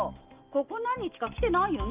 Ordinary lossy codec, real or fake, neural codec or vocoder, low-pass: Opus, 24 kbps; real; none; 3.6 kHz